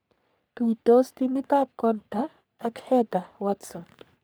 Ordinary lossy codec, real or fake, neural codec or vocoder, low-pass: none; fake; codec, 44.1 kHz, 3.4 kbps, Pupu-Codec; none